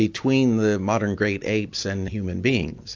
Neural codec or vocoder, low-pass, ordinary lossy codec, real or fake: none; 7.2 kHz; AAC, 48 kbps; real